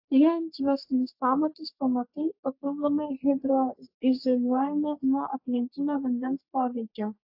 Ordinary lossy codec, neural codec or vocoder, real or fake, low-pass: AAC, 48 kbps; codec, 44.1 kHz, 3.4 kbps, Pupu-Codec; fake; 5.4 kHz